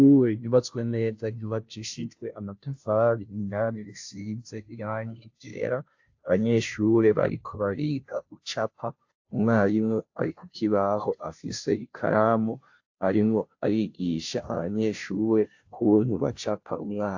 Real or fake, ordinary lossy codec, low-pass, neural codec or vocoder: fake; AAC, 48 kbps; 7.2 kHz; codec, 16 kHz, 0.5 kbps, FunCodec, trained on Chinese and English, 25 frames a second